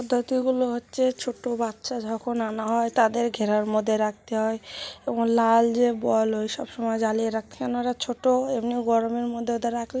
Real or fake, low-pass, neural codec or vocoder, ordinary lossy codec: real; none; none; none